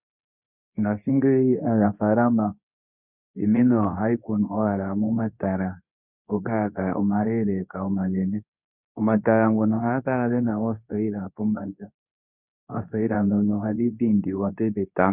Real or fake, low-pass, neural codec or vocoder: fake; 3.6 kHz; codec, 24 kHz, 0.9 kbps, WavTokenizer, medium speech release version 2